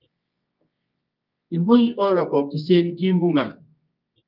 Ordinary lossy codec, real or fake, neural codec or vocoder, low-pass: Opus, 24 kbps; fake; codec, 24 kHz, 0.9 kbps, WavTokenizer, medium music audio release; 5.4 kHz